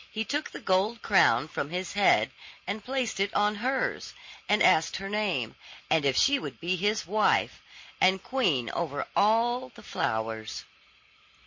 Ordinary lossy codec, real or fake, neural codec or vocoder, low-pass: MP3, 32 kbps; real; none; 7.2 kHz